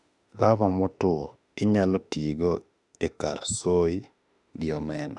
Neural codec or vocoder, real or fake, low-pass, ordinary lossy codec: autoencoder, 48 kHz, 32 numbers a frame, DAC-VAE, trained on Japanese speech; fake; 10.8 kHz; none